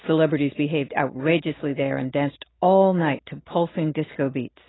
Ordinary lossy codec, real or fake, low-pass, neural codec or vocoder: AAC, 16 kbps; real; 7.2 kHz; none